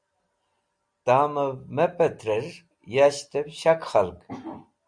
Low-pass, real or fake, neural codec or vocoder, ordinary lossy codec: 9.9 kHz; real; none; Opus, 64 kbps